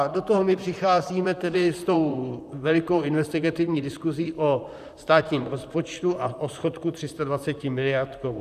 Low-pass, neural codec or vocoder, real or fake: 14.4 kHz; vocoder, 44.1 kHz, 128 mel bands, Pupu-Vocoder; fake